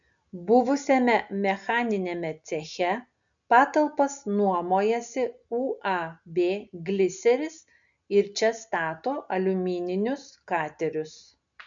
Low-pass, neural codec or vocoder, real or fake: 7.2 kHz; none; real